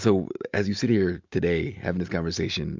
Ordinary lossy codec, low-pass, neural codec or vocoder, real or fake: MP3, 64 kbps; 7.2 kHz; none; real